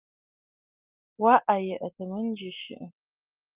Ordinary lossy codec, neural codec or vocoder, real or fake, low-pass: Opus, 32 kbps; none; real; 3.6 kHz